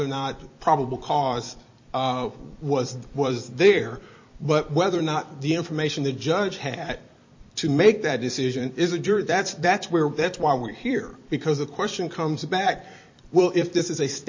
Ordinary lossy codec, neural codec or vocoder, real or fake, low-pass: MP3, 32 kbps; vocoder, 44.1 kHz, 128 mel bands every 256 samples, BigVGAN v2; fake; 7.2 kHz